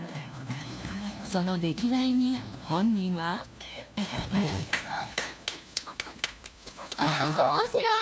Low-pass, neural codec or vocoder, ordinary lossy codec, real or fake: none; codec, 16 kHz, 1 kbps, FunCodec, trained on LibriTTS, 50 frames a second; none; fake